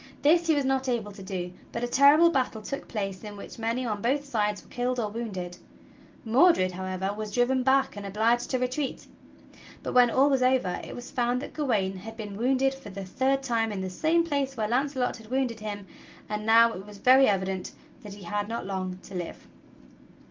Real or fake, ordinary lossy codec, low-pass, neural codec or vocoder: real; Opus, 32 kbps; 7.2 kHz; none